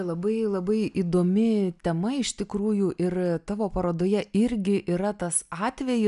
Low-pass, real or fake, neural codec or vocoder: 10.8 kHz; real; none